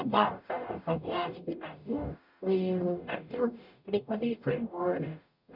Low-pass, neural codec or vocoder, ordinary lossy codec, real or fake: 5.4 kHz; codec, 44.1 kHz, 0.9 kbps, DAC; none; fake